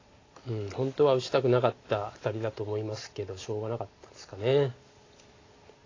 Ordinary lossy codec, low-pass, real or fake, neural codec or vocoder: AAC, 32 kbps; 7.2 kHz; real; none